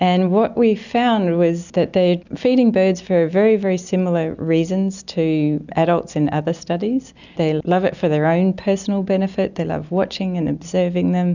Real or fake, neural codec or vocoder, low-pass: real; none; 7.2 kHz